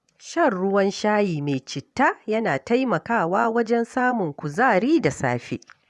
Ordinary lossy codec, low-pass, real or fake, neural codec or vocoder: none; none; fake; vocoder, 24 kHz, 100 mel bands, Vocos